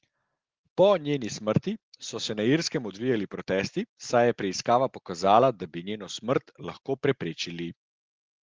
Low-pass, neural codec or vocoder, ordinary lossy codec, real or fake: 7.2 kHz; none; Opus, 16 kbps; real